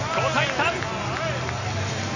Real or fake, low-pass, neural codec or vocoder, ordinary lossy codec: real; 7.2 kHz; none; none